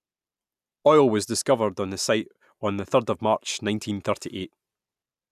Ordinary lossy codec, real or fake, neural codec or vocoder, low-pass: none; real; none; 14.4 kHz